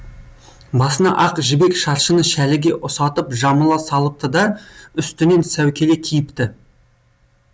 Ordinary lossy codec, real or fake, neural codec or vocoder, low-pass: none; real; none; none